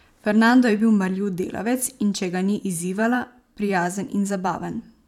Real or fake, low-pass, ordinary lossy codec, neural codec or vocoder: fake; 19.8 kHz; none; vocoder, 44.1 kHz, 128 mel bands every 512 samples, BigVGAN v2